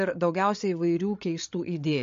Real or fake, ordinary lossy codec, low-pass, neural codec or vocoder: fake; MP3, 48 kbps; 7.2 kHz; codec, 16 kHz, 8 kbps, FreqCodec, larger model